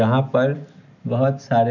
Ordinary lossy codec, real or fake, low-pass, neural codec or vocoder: none; real; 7.2 kHz; none